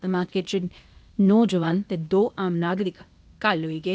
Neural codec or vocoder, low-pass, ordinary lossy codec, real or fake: codec, 16 kHz, 0.8 kbps, ZipCodec; none; none; fake